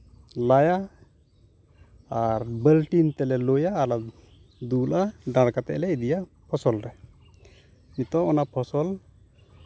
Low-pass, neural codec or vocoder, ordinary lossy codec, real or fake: none; none; none; real